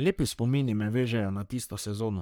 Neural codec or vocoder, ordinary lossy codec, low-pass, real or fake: codec, 44.1 kHz, 3.4 kbps, Pupu-Codec; none; none; fake